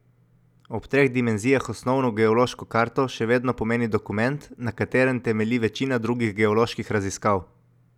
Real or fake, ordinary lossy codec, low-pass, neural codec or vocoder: real; none; 19.8 kHz; none